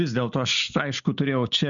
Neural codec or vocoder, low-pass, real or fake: none; 7.2 kHz; real